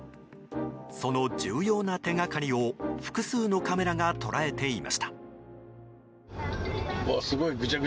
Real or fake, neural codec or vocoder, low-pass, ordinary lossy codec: real; none; none; none